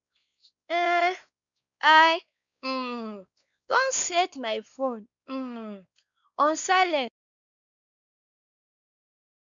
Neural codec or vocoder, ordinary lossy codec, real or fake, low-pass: codec, 16 kHz, 2 kbps, X-Codec, WavLM features, trained on Multilingual LibriSpeech; none; fake; 7.2 kHz